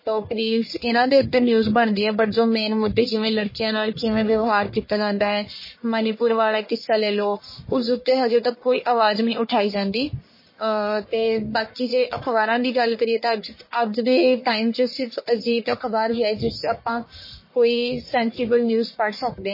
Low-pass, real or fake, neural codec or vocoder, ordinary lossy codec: 5.4 kHz; fake; codec, 44.1 kHz, 1.7 kbps, Pupu-Codec; MP3, 24 kbps